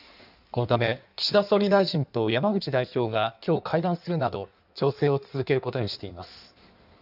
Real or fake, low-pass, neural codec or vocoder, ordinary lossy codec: fake; 5.4 kHz; codec, 16 kHz in and 24 kHz out, 1.1 kbps, FireRedTTS-2 codec; none